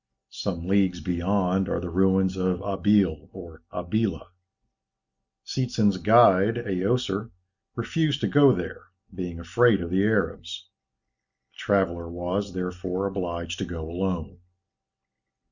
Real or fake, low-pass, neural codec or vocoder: real; 7.2 kHz; none